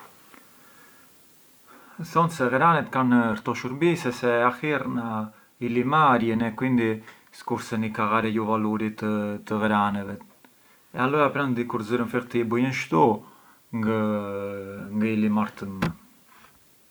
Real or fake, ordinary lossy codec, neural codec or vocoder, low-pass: real; none; none; none